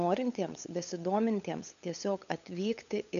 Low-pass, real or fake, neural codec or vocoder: 7.2 kHz; fake; codec, 16 kHz, 8 kbps, FunCodec, trained on LibriTTS, 25 frames a second